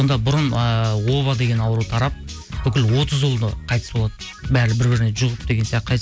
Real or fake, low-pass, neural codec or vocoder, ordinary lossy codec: real; none; none; none